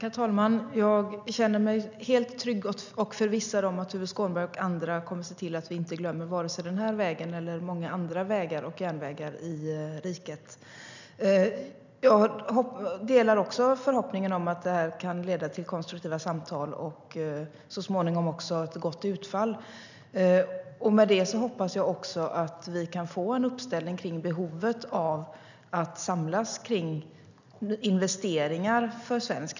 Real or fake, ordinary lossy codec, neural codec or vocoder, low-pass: real; none; none; 7.2 kHz